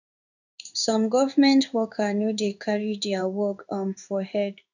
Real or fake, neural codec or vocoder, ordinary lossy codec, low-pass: fake; codec, 16 kHz in and 24 kHz out, 1 kbps, XY-Tokenizer; none; 7.2 kHz